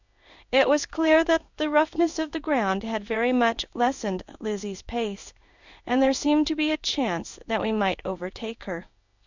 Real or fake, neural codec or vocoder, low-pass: fake; codec, 16 kHz in and 24 kHz out, 1 kbps, XY-Tokenizer; 7.2 kHz